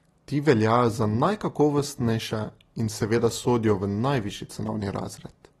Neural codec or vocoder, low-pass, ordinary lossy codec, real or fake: none; 19.8 kHz; AAC, 32 kbps; real